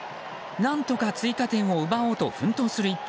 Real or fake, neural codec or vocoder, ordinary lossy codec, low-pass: real; none; none; none